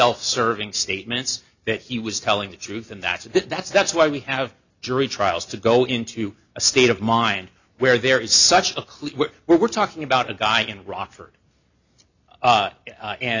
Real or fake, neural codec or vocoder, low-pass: real; none; 7.2 kHz